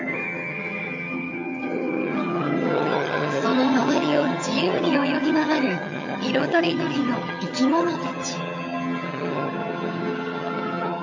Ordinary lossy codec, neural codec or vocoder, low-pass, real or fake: MP3, 64 kbps; vocoder, 22.05 kHz, 80 mel bands, HiFi-GAN; 7.2 kHz; fake